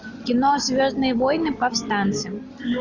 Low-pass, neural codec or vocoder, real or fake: 7.2 kHz; none; real